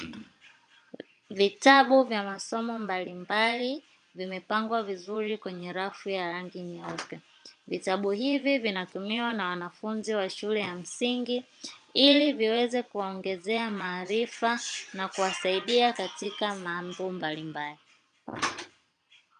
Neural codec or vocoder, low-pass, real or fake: vocoder, 22.05 kHz, 80 mel bands, Vocos; 9.9 kHz; fake